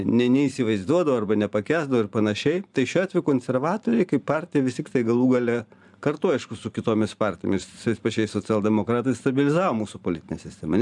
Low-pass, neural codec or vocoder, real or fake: 10.8 kHz; vocoder, 44.1 kHz, 128 mel bands every 512 samples, BigVGAN v2; fake